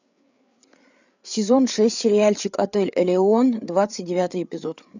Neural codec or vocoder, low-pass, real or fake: codec, 16 kHz, 8 kbps, FreqCodec, larger model; 7.2 kHz; fake